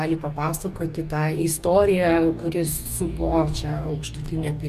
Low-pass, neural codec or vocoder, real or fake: 14.4 kHz; codec, 44.1 kHz, 2.6 kbps, DAC; fake